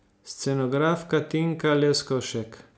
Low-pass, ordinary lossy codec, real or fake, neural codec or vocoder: none; none; real; none